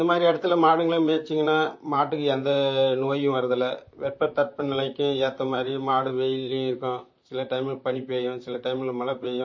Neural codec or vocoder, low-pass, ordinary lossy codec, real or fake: vocoder, 44.1 kHz, 128 mel bands, Pupu-Vocoder; 7.2 kHz; MP3, 32 kbps; fake